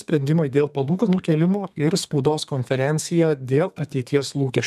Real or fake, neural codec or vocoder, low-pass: fake; codec, 32 kHz, 1.9 kbps, SNAC; 14.4 kHz